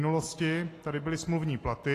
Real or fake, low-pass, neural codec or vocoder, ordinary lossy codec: real; 14.4 kHz; none; AAC, 48 kbps